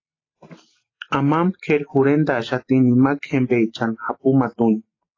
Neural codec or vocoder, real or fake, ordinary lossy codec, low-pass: none; real; AAC, 32 kbps; 7.2 kHz